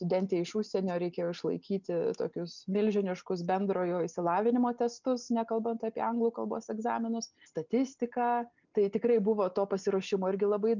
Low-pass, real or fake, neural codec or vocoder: 7.2 kHz; real; none